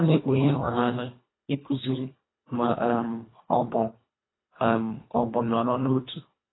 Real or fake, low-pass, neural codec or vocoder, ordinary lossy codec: fake; 7.2 kHz; codec, 24 kHz, 1.5 kbps, HILCodec; AAC, 16 kbps